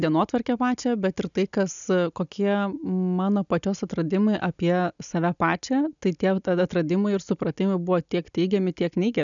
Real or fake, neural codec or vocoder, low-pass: real; none; 7.2 kHz